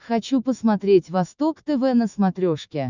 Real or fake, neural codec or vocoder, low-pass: fake; autoencoder, 48 kHz, 128 numbers a frame, DAC-VAE, trained on Japanese speech; 7.2 kHz